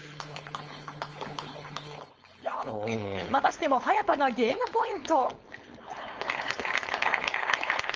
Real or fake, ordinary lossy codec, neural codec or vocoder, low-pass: fake; Opus, 24 kbps; codec, 16 kHz, 4.8 kbps, FACodec; 7.2 kHz